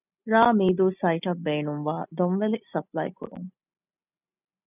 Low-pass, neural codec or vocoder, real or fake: 3.6 kHz; none; real